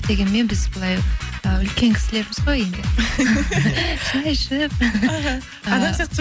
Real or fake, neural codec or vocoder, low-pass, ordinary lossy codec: real; none; none; none